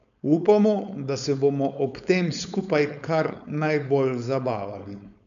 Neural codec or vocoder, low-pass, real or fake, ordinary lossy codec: codec, 16 kHz, 4.8 kbps, FACodec; 7.2 kHz; fake; none